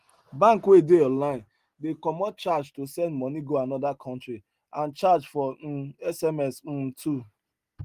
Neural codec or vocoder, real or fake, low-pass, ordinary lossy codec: none; real; 14.4 kHz; Opus, 24 kbps